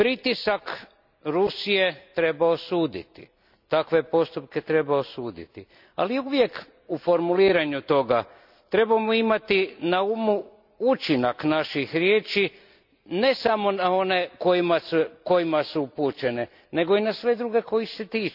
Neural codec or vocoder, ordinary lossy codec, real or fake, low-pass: none; none; real; 5.4 kHz